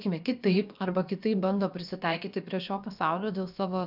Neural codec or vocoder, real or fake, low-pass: codec, 16 kHz, about 1 kbps, DyCAST, with the encoder's durations; fake; 5.4 kHz